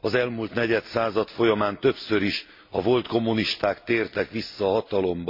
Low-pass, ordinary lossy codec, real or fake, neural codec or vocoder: 5.4 kHz; MP3, 32 kbps; real; none